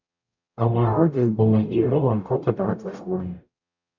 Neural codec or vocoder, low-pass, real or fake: codec, 44.1 kHz, 0.9 kbps, DAC; 7.2 kHz; fake